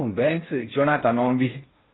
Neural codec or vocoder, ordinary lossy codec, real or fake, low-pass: codec, 16 kHz in and 24 kHz out, 0.6 kbps, FocalCodec, streaming, 4096 codes; AAC, 16 kbps; fake; 7.2 kHz